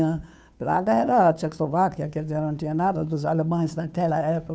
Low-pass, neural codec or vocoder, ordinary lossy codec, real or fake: none; codec, 16 kHz, 2 kbps, FunCodec, trained on Chinese and English, 25 frames a second; none; fake